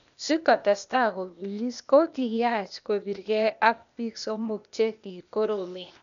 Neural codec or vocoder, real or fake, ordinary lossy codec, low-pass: codec, 16 kHz, 0.8 kbps, ZipCodec; fake; none; 7.2 kHz